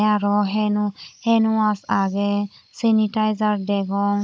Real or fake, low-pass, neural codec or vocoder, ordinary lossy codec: fake; none; codec, 16 kHz, 16 kbps, FunCodec, trained on Chinese and English, 50 frames a second; none